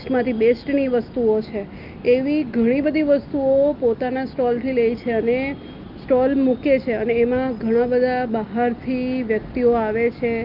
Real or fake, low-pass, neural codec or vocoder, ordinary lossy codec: real; 5.4 kHz; none; Opus, 32 kbps